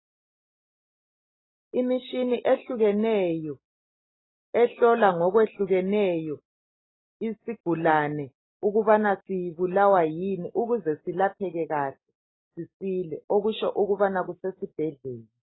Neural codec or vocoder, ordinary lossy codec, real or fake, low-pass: none; AAC, 16 kbps; real; 7.2 kHz